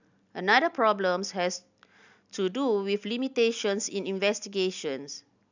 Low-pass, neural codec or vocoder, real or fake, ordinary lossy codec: 7.2 kHz; none; real; none